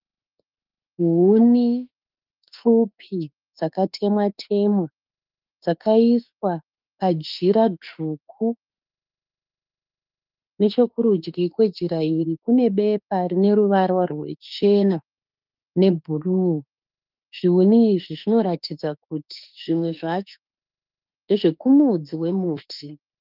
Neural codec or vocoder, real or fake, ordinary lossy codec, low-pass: autoencoder, 48 kHz, 32 numbers a frame, DAC-VAE, trained on Japanese speech; fake; Opus, 32 kbps; 5.4 kHz